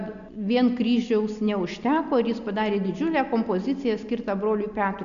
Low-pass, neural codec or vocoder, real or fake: 7.2 kHz; none; real